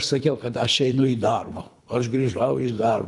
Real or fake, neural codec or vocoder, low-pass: fake; codec, 24 kHz, 3 kbps, HILCodec; 10.8 kHz